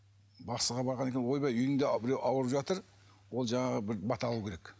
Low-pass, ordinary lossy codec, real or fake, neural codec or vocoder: none; none; real; none